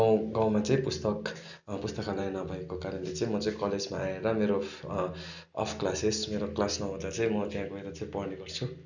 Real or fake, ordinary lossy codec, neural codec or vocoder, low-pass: real; none; none; 7.2 kHz